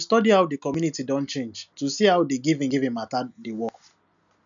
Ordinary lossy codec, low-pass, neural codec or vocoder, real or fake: none; 7.2 kHz; none; real